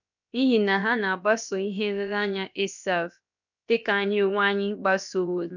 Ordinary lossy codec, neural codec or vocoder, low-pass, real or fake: none; codec, 16 kHz, about 1 kbps, DyCAST, with the encoder's durations; 7.2 kHz; fake